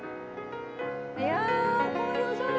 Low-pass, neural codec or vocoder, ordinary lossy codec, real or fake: none; none; none; real